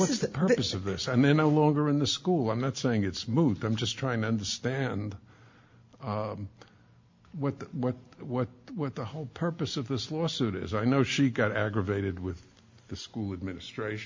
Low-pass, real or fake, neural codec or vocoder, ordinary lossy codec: 7.2 kHz; real; none; MP3, 48 kbps